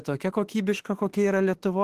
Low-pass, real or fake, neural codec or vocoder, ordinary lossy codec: 14.4 kHz; fake; autoencoder, 48 kHz, 32 numbers a frame, DAC-VAE, trained on Japanese speech; Opus, 16 kbps